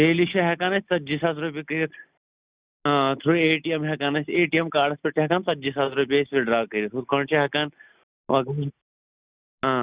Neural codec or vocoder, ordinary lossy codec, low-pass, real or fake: none; Opus, 64 kbps; 3.6 kHz; real